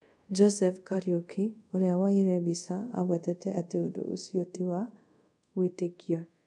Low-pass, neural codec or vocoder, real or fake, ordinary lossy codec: none; codec, 24 kHz, 0.5 kbps, DualCodec; fake; none